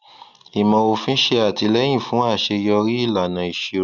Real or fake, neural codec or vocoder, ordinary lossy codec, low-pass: real; none; none; 7.2 kHz